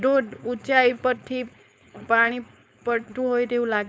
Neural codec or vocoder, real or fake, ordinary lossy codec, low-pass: codec, 16 kHz, 4.8 kbps, FACodec; fake; none; none